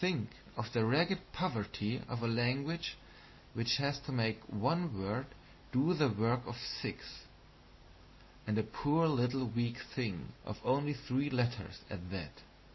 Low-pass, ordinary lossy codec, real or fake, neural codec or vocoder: 7.2 kHz; MP3, 24 kbps; real; none